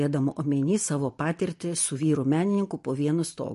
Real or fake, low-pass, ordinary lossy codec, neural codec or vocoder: real; 14.4 kHz; MP3, 48 kbps; none